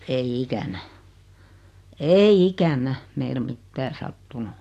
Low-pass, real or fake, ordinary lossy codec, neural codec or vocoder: 14.4 kHz; real; AAC, 64 kbps; none